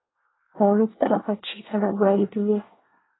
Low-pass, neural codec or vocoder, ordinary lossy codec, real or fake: 7.2 kHz; codec, 24 kHz, 1 kbps, SNAC; AAC, 16 kbps; fake